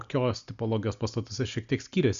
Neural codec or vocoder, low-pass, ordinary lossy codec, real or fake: none; 7.2 kHz; Opus, 64 kbps; real